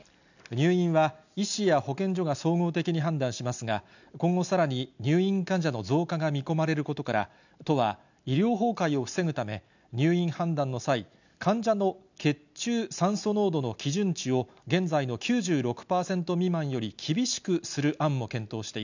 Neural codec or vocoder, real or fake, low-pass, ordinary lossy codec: none; real; 7.2 kHz; none